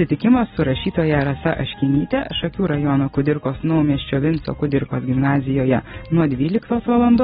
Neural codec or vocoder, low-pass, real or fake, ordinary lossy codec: none; 19.8 kHz; real; AAC, 16 kbps